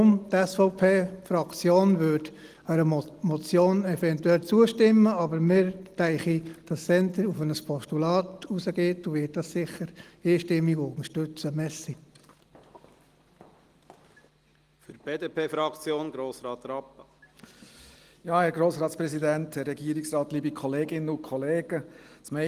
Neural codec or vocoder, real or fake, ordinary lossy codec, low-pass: none; real; Opus, 24 kbps; 14.4 kHz